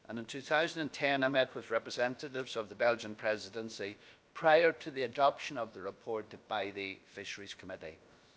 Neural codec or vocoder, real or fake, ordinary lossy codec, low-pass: codec, 16 kHz, about 1 kbps, DyCAST, with the encoder's durations; fake; none; none